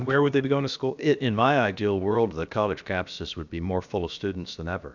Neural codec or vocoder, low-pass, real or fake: codec, 16 kHz, about 1 kbps, DyCAST, with the encoder's durations; 7.2 kHz; fake